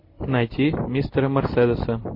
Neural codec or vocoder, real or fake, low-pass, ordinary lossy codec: none; real; 5.4 kHz; MP3, 32 kbps